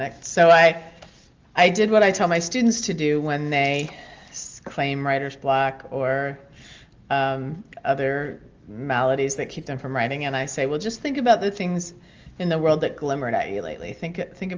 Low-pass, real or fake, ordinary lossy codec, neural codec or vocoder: 7.2 kHz; real; Opus, 24 kbps; none